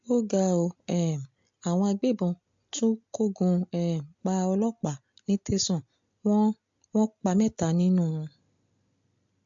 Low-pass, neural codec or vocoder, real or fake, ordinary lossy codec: 7.2 kHz; none; real; MP3, 48 kbps